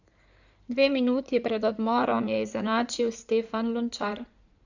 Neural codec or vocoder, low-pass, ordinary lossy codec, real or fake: codec, 16 kHz in and 24 kHz out, 2.2 kbps, FireRedTTS-2 codec; 7.2 kHz; none; fake